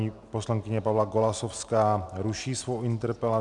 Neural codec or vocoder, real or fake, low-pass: none; real; 10.8 kHz